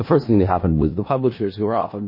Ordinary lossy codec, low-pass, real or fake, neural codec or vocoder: MP3, 24 kbps; 5.4 kHz; fake; codec, 16 kHz in and 24 kHz out, 0.4 kbps, LongCat-Audio-Codec, four codebook decoder